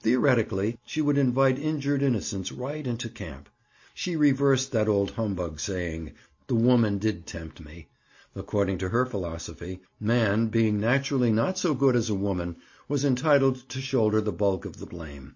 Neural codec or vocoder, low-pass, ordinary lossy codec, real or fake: none; 7.2 kHz; MP3, 32 kbps; real